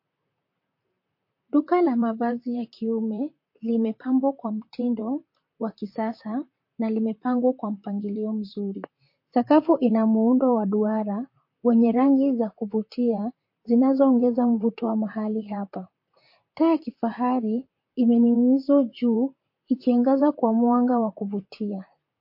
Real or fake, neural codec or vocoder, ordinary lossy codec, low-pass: fake; vocoder, 44.1 kHz, 128 mel bands, Pupu-Vocoder; MP3, 32 kbps; 5.4 kHz